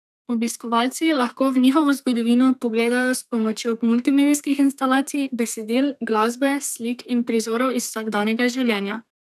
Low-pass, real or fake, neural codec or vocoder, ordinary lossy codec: 14.4 kHz; fake; codec, 32 kHz, 1.9 kbps, SNAC; none